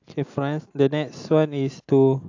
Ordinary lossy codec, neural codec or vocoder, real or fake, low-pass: AAC, 48 kbps; none; real; 7.2 kHz